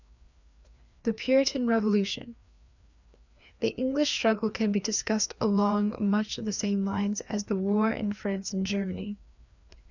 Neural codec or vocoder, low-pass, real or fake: codec, 16 kHz, 2 kbps, FreqCodec, larger model; 7.2 kHz; fake